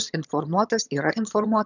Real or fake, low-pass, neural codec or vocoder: fake; 7.2 kHz; vocoder, 22.05 kHz, 80 mel bands, HiFi-GAN